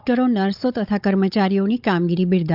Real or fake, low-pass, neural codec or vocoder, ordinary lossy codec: fake; 5.4 kHz; codec, 16 kHz, 16 kbps, FunCodec, trained on Chinese and English, 50 frames a second; none